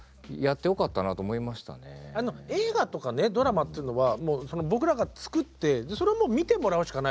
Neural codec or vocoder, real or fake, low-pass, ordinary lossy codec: none; real; none; none